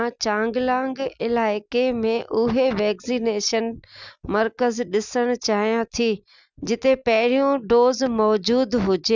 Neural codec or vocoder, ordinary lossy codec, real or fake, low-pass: none; none; real; 7.2 kHz